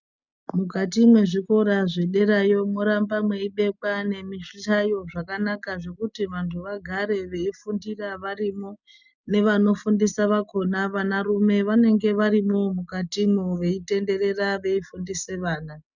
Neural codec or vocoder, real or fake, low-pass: none; real; 7.2 kHz